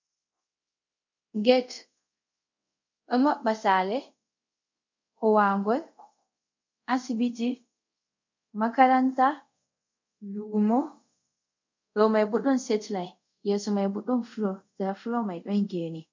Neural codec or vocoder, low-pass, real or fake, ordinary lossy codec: codec, 24 kHz, 0.5 kbps, DualCodec; 7.2 kHz; fake; MP3, 64 kbps